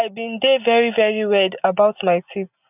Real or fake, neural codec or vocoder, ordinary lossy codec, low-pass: real; none; none; 3.6 kHz